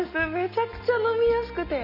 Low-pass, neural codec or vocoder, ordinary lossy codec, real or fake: 5.4 kHz; none; none; real